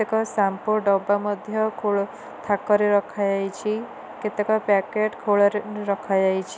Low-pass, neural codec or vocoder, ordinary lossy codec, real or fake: none; none; none; real